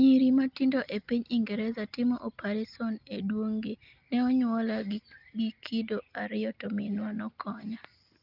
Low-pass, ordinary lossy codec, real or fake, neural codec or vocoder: 5.4 kHz; Opus, 24 kbps; real; none